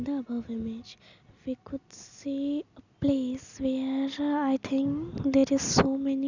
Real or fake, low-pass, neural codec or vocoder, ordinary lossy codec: real; 7.2 kHz; none; none